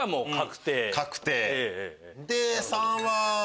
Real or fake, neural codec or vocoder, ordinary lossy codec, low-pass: real; none; none; none